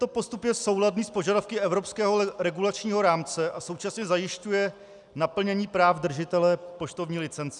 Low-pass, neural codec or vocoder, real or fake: 10.8 kHz; none; real